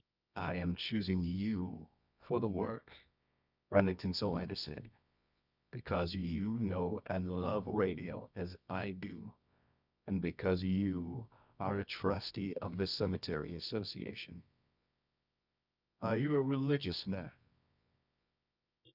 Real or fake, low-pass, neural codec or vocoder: fake; 5.4 kHz; codec, 24 kHz, 0.9 kbps, WavTokenizer, medium music audio release